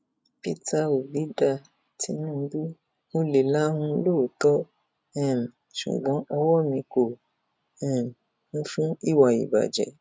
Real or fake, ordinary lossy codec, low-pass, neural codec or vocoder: real; none; none; none